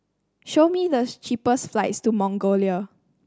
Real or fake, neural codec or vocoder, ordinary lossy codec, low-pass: real; none; none; none